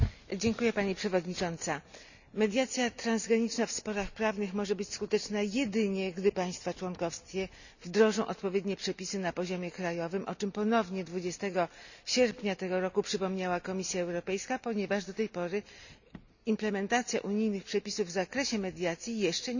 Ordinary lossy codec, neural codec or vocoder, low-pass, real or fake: none; none; 7.2 kHz; real